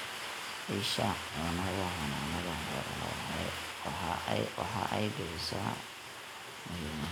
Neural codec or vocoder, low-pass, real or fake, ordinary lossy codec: none; none; real; none